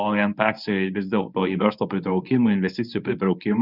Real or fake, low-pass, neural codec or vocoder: fake; 5.4 kHz; codec, 24 kHz, 0.9 kbps, WavTokenizer, medium speech release version 1